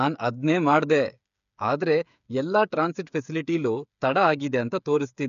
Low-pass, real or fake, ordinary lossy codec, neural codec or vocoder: 7.2 kHz; fake; none; codec, 16 kHz, 8 kbps, FreqCodec, smaller model